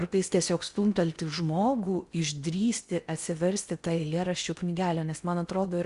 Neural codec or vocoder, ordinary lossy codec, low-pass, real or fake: codec, 16 kHz in and 24 kHz out, 0.6 kbps, FocalCodec, streaming, 4096 codes; Opus, 64 kbps; 10.8 kHz; fake